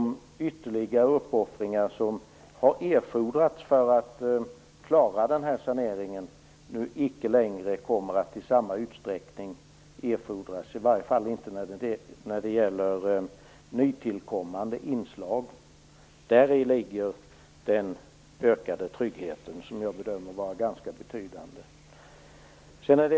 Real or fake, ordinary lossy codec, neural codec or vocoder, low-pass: real; none; none; none